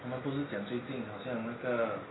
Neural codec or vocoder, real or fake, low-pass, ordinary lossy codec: none; real; 7.2 kHz; AAC, 16 kbps